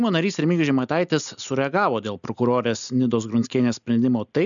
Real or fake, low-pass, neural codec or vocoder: real; 7.2 kHz; none